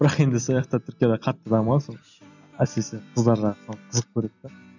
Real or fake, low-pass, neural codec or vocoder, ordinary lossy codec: real; 7.2 kHz; none; none